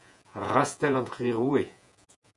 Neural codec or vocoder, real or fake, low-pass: vocoder, 48 kHz, 128 mel bands, Vocos; fake; 10.8 kHz